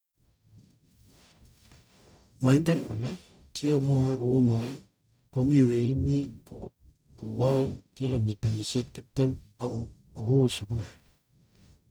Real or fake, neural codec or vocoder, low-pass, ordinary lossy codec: fake; codec, 44.1 kHz, 0.9 kbps, DAC; none; none